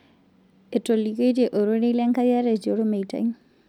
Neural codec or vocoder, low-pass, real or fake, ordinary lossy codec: none; 19.8 kHz; real; none